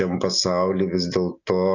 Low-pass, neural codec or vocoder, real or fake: 7.2 kHz; none; real